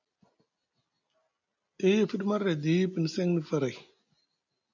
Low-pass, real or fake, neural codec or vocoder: 7.2 kHz; real; none